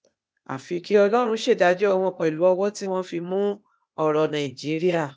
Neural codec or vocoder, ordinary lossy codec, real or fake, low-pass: codec, 16 kHz, 0.8 kbps, ZipCodec; none; fake; none